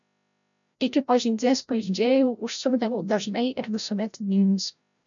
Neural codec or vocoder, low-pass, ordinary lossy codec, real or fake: codec, 16 kHz, 0.5 kbps, FreqCodec, larger model; 7.2 kHz; MP3, 96 kbps; fake